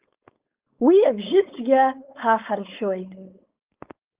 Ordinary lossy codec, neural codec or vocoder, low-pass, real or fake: Opus, 32 kbps; codec, 16 kHz, 4.8 kbps, FACodec; 3.6 kHz; fake